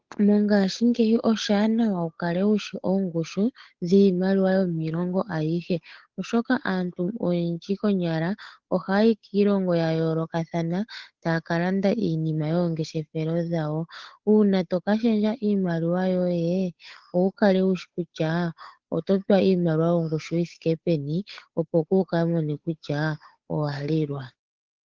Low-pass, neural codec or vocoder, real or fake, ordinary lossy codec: 7.2 kHz; codec, 16 kHz, 8 kbps, FunCodec, trained on Chinese and English, 25 frames a second; fake; Opus, 16 kbps